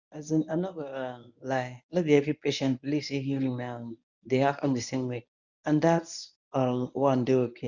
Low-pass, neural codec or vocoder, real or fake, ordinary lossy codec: 7.2 kHz; codec, 24 kHz, 0.9 kbps, WavTokenizer, medium speech release version 1; fake; none